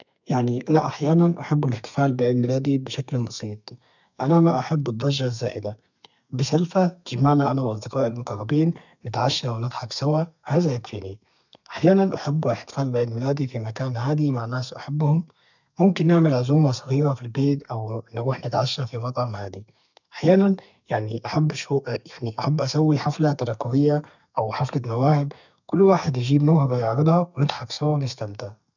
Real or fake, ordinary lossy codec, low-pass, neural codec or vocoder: fake; none; 7.2 kHz; codec, 32 kHz, 1.9 kbps, SNAC